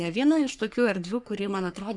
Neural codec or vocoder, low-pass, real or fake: codec, 44.1 kHz, 3.4 kbps, Pupu-Codec; 10.8 kHz; fake